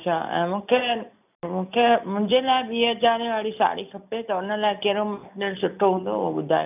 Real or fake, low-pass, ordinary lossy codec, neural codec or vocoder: real; 3.6 kHz; none; none